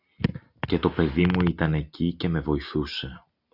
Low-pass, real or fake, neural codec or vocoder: 5.4 kHz; real; none